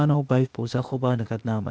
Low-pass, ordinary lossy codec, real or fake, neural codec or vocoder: none; none; fake; codec, 16 kHz, 0.8 kbps, ZipCodec